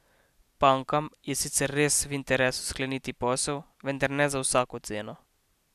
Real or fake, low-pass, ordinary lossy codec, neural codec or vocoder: real; 14.4 kHz; none; none